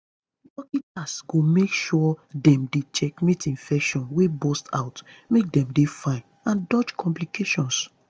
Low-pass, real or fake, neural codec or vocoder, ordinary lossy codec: none; real; none; none